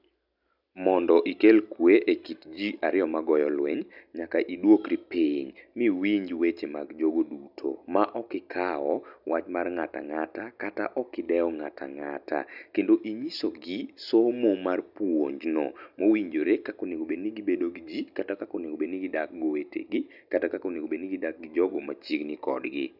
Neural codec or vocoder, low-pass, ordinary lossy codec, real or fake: none; 5.4 kHz; none; real